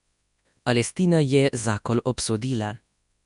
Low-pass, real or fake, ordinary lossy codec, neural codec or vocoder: 10.8 kHz; fake; none; codec, 24 kHz, 0.9 kbps, WavTokenizer, large speech release